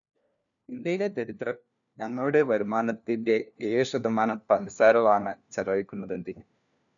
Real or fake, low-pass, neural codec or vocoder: fake; 7.2 kHz; codec, 16 kHz, 1 kbps, FunCodec, trained on LibriTTS, 50 frames a second